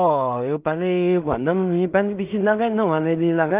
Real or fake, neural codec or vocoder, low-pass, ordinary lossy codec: fake; codec, 16 kHz in and 24 kHz out, 0.4 kbps, LongCat-Audio-Codec, two codebook decoder; 3.6 kHz; Opus, 24 kbps